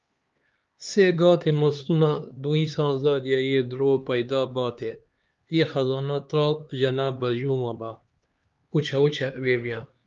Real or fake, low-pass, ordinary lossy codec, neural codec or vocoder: fake; 7.2 kHz; Opus, 32 kbps; codec, 16 kHz, 2 kbps, X-Codec, HuBERT features, trained on LibriSpeech